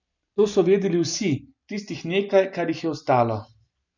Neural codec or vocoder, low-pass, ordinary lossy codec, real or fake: none; 7.2 kHz; none; real